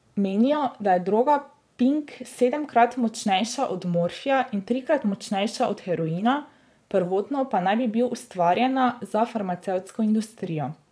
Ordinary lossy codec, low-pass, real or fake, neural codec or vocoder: none; none; fake; vocoder, 22.05 kHz, 80 mel bands, Vocos